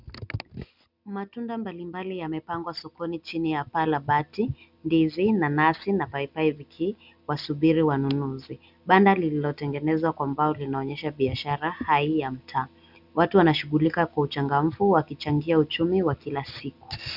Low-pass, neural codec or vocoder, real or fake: 5.4 kHz; none; real